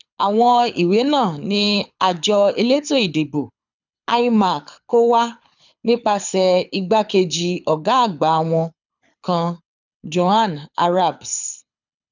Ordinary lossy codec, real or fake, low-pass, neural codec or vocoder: none; fake; 7.2 kHz; codec, 24 kHz, 6 kbps, HILCodec